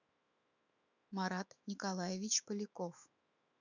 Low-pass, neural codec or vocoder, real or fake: 7.2 kHz; autoencoder, 48 kHz, 128 numbers a frame, DAC-VAE, trained on Japanese speech; fake